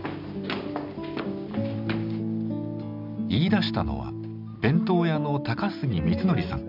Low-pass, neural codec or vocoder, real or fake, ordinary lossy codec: 5.4 kHz; none; real; none